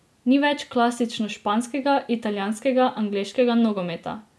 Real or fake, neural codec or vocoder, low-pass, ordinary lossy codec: real; none; none; none